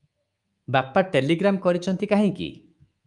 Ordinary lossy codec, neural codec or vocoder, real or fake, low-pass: Opus, 32 kbps; codec, 24 kHz, 3.1 kbps, DualCodec; fake; 10.8 kHz